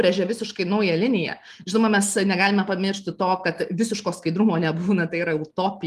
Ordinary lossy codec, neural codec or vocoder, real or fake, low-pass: Opus, 32 kbps; none; real; 14.4 kHz